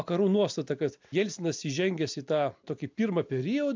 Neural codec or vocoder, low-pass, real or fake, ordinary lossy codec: none; 7.2 kHz; real; MP3, 64 kbps